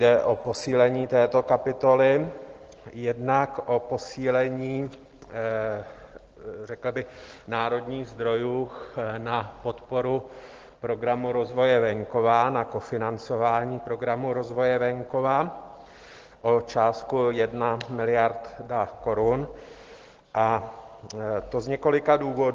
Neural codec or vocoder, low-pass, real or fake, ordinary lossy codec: none; 7.2 kHz; real; Opus, 16 kbps